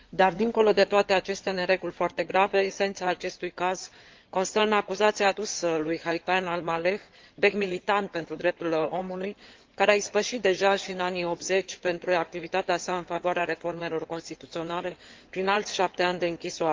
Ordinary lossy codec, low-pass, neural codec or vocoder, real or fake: Opus, 32 kbps; 7.2 kHz; codec, 16 kHz in and 24 kHz out, 2.2 kbps, FireRedTTS-2 codec; fake